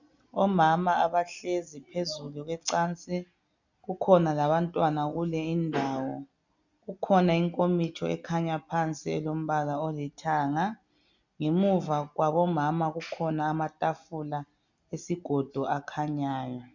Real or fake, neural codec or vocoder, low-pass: real; none; 7.2 kHz